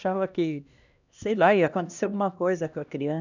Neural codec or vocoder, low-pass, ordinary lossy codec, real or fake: codec, 16 kHz, 1 kbps, X-Codec, HuBERT features, trained on LibriSpeech; 7.2 kHz; none; fake